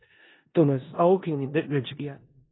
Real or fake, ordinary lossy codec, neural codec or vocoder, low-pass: fake; AAC, 16 kbps; codec, 16 kHz in and 24 kHz out, 0.4 kbps, LongCat-Audio-Codec, four codebook decoder; 7.2 kHz